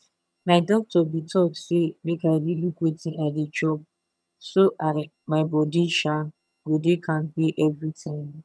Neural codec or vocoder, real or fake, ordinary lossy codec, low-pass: vocoder, 22.05 kHz, 80 mel bands, HiFi-GAN; fake; none; none